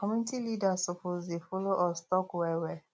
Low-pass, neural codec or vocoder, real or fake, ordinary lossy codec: none; none; real; none